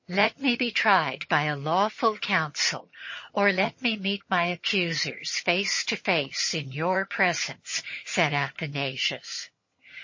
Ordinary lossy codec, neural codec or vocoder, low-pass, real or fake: MP3, 32 kbps; vocoder, 22.05 kHz, 80 mel bands, HiFi-GAN; 7.2 kHz; fake